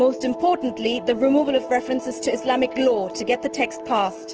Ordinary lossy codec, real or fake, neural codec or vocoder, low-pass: Opus, 16 kbps; fake; autoencoder, 48 kHz, 128 numbers a frame, DAC-VAE, trained on Japanese speech; 7.2 kHz